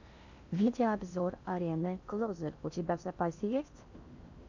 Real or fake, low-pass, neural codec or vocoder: fake; 7.2 kHz; codec, 16 kHz in and 24 kHz out, 0.8 kbps, FocalCodec, streaming, 65536 codes